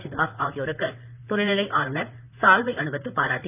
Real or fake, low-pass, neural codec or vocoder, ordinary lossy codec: fake; 3.6 kHz; vocoder, 44.1 kHz, 128 mel bands, Pupu-Vocoder; none